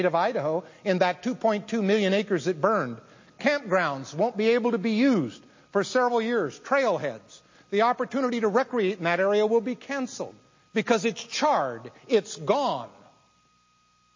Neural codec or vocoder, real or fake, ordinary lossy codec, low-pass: none; real; MP3, 32 kbps; 7.2 kHz